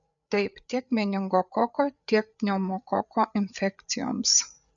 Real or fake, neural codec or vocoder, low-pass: fake; codec, 16 kHz, 8 kbps, FreqCodec, larger model; 7.2 kHz